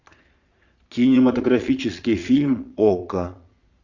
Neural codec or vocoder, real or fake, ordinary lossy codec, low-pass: vocoder, 22.05 kHz, 80 mel bands, Vocos; fake; Opus, 64 kbps; 7.2 kHz